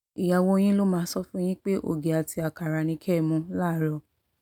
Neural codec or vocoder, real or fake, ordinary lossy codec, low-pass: none; real; none; 19.8 kHz